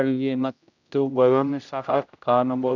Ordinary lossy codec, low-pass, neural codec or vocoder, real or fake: none; 7.2 kHz; codec, 16 kHz, 0.5 kbps, X-Codec, HuBERT features, trained on general audio; fake